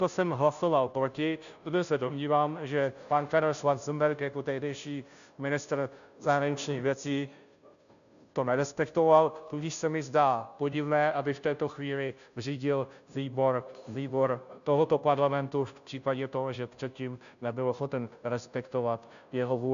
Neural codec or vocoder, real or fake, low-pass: codec, 16 kHz, 0.5 kbps, FunCodec, trained on Chinese and English, 25 frames a second; fake; 7.2 kHz